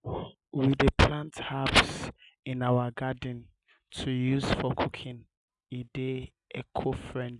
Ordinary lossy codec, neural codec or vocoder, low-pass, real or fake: none; none; 10.8 kHz; real